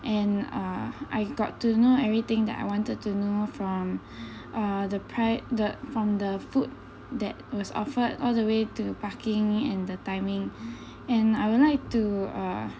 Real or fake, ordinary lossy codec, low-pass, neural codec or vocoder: real; none; none; none